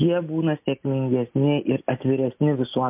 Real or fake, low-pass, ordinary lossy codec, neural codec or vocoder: real; 3.6 kHz; MP3, 32 kbps; none